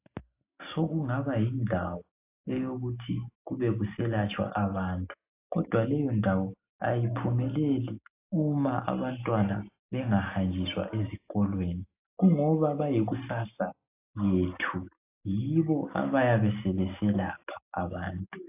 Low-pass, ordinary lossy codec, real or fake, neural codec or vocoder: 3.6 kHz; AAC, 24 kbps; real; none